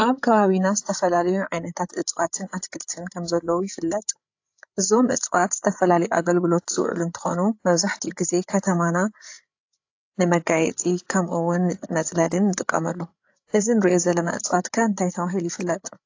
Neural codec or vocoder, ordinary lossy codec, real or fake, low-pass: codec, 16 kHz, 8 kbps, FreqCodec, larger model; AAC, 48 kbps; fake; 7.2 kHz